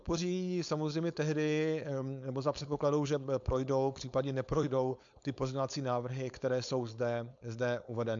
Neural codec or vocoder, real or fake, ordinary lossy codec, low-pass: codec, 16 kHz, 4.8 kbps, FACodec; fake; MP3, 64 kbps; 7.2 kHz